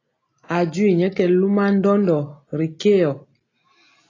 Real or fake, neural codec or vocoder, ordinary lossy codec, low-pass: real; none; AAC, 32 kbps; 7.2 kHz